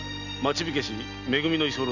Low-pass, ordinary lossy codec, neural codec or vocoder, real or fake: 7.2 kHz; none; none; real